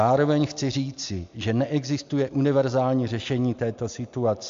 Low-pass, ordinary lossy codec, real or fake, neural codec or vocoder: 7.2 kHz; AAC, 64 kbps; real; none